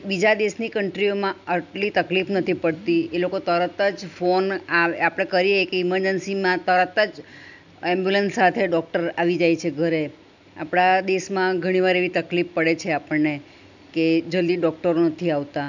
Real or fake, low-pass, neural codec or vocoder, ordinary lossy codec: real; 7.2 kHz; none; none